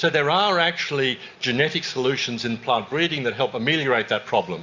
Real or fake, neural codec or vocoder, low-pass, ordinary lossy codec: real; none; 7.2 kHz; Opus, 64 kbps